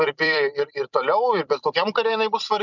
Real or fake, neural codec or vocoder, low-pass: fake; vocoder, 44.1 kHz, 128 mel bands every 256 samples, BigVGAN v2; 7.2 kHz